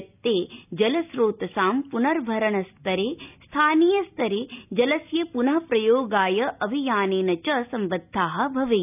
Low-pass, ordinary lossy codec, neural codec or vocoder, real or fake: 3.6 kHz; none; none; real